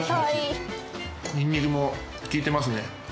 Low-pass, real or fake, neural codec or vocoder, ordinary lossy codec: none; real; none; none